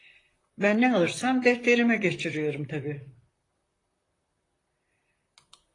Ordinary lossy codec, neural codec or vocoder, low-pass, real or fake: AAC, 32 kbps; vocoder, 44.1 kHz, 128 mel bands, Pupu-Vocoder; 10.8 kHz; fake